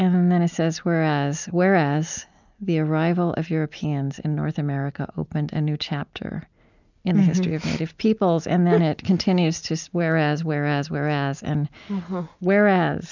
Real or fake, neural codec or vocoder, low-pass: real; none; 7.2 kHz